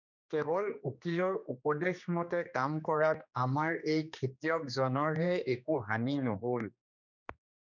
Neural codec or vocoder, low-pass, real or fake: codec, 16 kHz, 2 kbps, X-Codec, HuBERT features, trained on general audio; 7.2 kHz; fake